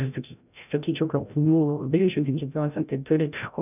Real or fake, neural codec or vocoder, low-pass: fake; codec, 16 kHz, 0.5 kbps, FreqCodec, larger model; 3.6 kHz